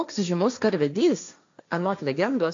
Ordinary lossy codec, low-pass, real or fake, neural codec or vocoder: AAC, 64 kbps; 7.2 kHz; fake; codec, 16 kHz, 1.1 kbps, Voila-Tokenizer